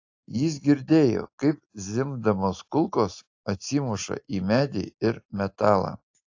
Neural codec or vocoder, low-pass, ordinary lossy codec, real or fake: none; 7.2 kHz; AAC, 48 kbps; real